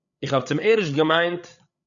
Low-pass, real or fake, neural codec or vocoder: 7.2 kHz; fake; codec, 16 kHz, 8 kbps, FreqCodec, larger model